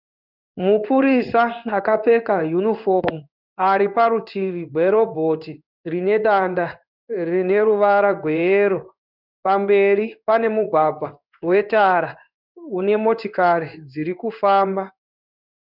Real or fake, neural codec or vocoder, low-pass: fake; codec, 16 kHz in and 24 kHz out, 1 kbps, XY-Tokenizer; 5.4 kHz